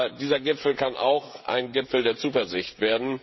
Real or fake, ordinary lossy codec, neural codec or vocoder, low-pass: fake; MP3, 24 kbps; codec, 16 kHz, 16 kbps, FreqCodec, smaller model; 7.2 kHz